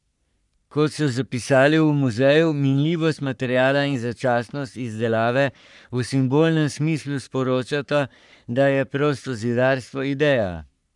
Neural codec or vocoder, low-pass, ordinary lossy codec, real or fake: codec, 44.1 kHz, 3.4 kbps, Pupu-Codec; 10.8 kHz; none; fake